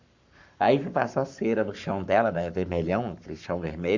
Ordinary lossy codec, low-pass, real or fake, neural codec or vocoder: Opus, 64 kbps; 7.2 kHz; fake; codec, 44.1 kHz, 7.8 kbps, Pupu-Codec